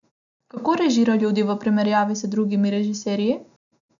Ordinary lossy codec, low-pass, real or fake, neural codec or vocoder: none; 7.2 kHz; real; none